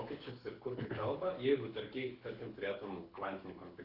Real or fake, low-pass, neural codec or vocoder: fake; 5.4 kHz; codec, 24 kHz, 6 kbps, HILCodec